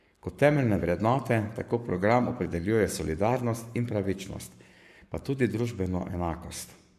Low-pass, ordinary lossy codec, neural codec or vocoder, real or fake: 14.4 kHz; MP3, 96 kbps; codec, 44.1 kHz, 7.8 kbps, Pupu-Codec; fake